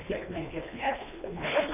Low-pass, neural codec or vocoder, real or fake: 3.6 kHz; codec, 24 kHz, 1.5 kbps, HILCodec; fake